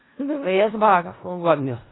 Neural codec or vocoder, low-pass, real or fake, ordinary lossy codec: codec, 16 kHz in and 24 kHz out, 0.4 kbps, LongCat-Audio-Codec, four codebook decoder; 7.2 kHz; fake; AAC, 16 kbps